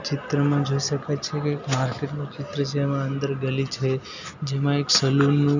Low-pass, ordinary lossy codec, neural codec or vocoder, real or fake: 7.2 kHz; none; none; real